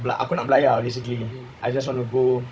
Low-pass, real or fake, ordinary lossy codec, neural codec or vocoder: none; fake; none; codec, 16 kHz, 16 kbps, FunCodec, trained on Chinese and English, 50 frames a second